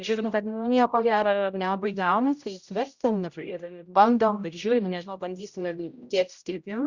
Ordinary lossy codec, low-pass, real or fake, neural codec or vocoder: Opus, 64 kbps; 7.2 kHz; fake; codec, 16 kHz, 0.5 kbps, X-Codec, HuBERT features, trained on general audio